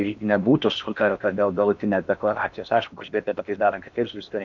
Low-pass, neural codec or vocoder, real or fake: 7.2 kHz; codec, 16 kHz in and 24 kHz out, 0.8 kbps, FocalCodec, streaming, 65536 codes; fake